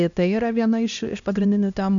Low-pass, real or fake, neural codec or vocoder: 7.2 kHz; fake; codec, 16 kHz, 1 kbps, X-Codec, HuBERT features, trained on LibriSpeech